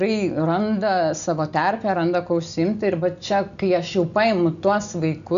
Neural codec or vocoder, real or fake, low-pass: none; real; 7.2 kHz